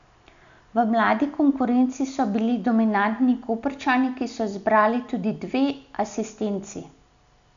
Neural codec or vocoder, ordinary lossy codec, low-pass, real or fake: none; MP3, 96 kbps; 7.2 kHz; real